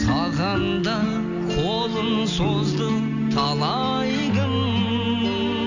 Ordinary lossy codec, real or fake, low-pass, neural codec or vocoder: none; real; 7.2 kHz; none